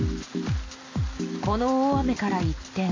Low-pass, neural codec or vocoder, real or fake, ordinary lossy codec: 7.2 kHz; none; real; AAC, 32 kbps